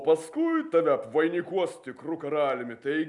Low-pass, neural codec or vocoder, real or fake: 10.8 kHz; none; real